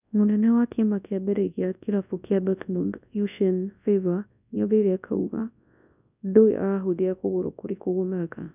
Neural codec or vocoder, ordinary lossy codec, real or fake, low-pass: codec, 24 kHz, 0.9 kbps, WavTokenizer, large speech release; none; fake; 3.6 kHz